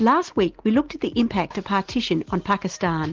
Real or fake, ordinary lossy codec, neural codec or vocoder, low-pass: real; Opus, 16 kbps; none; 7.2 kHz